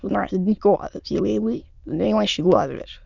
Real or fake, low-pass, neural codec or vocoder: fake; 7.2 kHz; autoencoder, 22.05 kHz, a latent of 192 numbers a frame, VITS, trained on many speakers